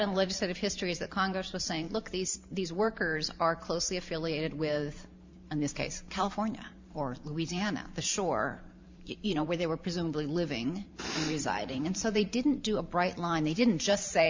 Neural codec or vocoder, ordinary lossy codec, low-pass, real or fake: vocoder, 44.1 kHz, 128 mel bands every 512 samples, BigVGAN v2; MP3, 64 kbps; 7.2 kHz; fake